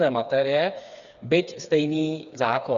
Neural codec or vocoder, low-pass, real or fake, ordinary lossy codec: codec, 16 kHz, 4 kbps, FreqCodec, smaller model; 7.2 kHz; fake; Opus, 64 kbps